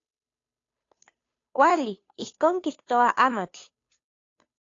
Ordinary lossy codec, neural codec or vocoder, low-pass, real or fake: AAC, 48 kbps; codec, 16 kHz, 2 kbps, FunCodec, trained on Chinese and English, 25 frames a second; 7.2 kHz; fake